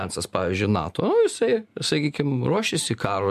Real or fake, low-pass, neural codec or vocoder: real; 14.4 kHz; none